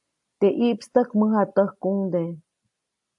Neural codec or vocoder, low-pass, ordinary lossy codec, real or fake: none; 10.8 kHz; AAC, 64 kbps; real